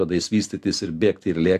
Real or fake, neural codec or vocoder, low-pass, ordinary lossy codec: real; none; 14.4 kHz; AAC, 64 kbps